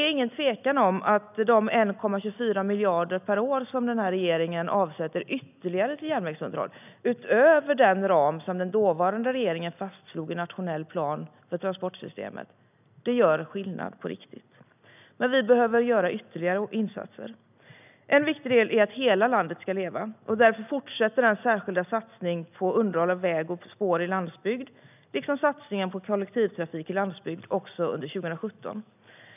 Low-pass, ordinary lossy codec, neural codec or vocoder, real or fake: 3.6 kHz; none; none; real